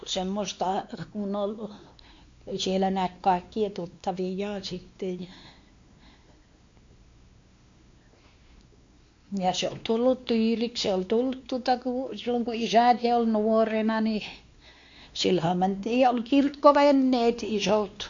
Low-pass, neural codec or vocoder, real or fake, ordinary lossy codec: 7.2 kHz; codec, 16 kHz, 2 kbps, X-Codec, WavLM features, trained on Multilingual LibriSpeech; fake; MP3, 48 kbps